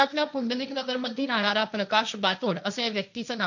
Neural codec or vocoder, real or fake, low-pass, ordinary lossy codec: codec, 16 kHz, 1.1 kbps, Voila-Tokenizer; fake; 7.2 kHz; none